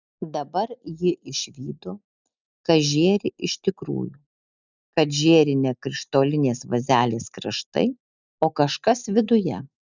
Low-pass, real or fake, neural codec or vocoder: 7.2 kHz; real; none